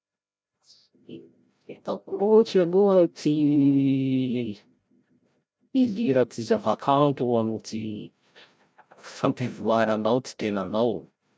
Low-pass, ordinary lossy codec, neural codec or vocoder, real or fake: none; none; codec, 16 kHz, 0.5 kbps, FreqCodec, larger model; fake